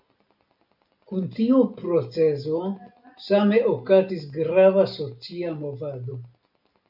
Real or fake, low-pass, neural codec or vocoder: real; 5.4 kHz; none